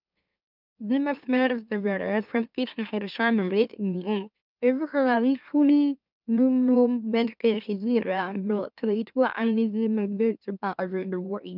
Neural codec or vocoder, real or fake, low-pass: autoencoder, 44.1 kHz, a latent of 192 numbers a frame, MeloTTS; fake; 5.4 kHz